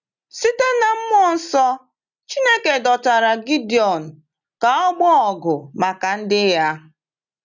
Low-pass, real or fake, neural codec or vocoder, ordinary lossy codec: 7.2 kHz; real; none; none